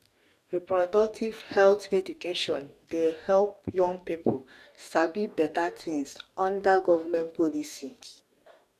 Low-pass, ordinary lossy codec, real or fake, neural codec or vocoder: 14.4 kHz; none; fake; codec, 44.1 kHz, 2.6 kbps, DAC